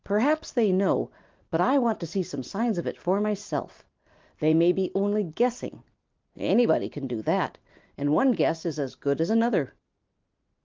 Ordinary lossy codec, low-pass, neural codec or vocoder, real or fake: Opus, 24 kbps; 7.2 kHz; none; real